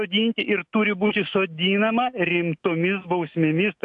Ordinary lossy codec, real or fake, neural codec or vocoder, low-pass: AAC, 64 kbps; fake; autoencoder, 48 kHz, 128 numbers a frame, DAC-VAE, trained on Japanese speech; 10.8 kHz